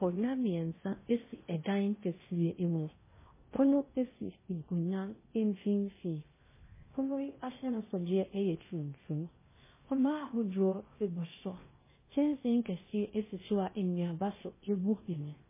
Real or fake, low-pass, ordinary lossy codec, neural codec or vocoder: fake; 3.6 kHz; MP3, 16 kbps; codec, 16 kHz in and 24 kHz out, 0.6 kbps, FocalCodec, streaming, 2048 codes